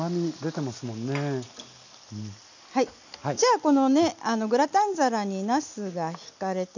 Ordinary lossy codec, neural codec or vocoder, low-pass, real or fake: none; none; 7.2 kHz; real